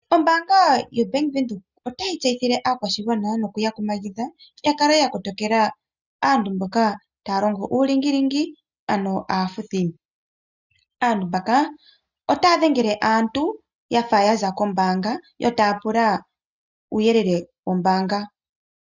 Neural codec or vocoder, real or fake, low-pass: none; real; 7.2 kHz